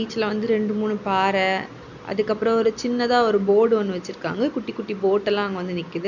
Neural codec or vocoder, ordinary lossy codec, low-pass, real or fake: none; none; 7.2 kHz; real